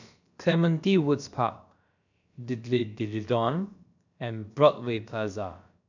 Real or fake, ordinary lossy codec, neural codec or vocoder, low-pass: fake; none; codec, 16 kHz, about 1 kbps, DyCAST, with the encoder's durations; 7.2 kHz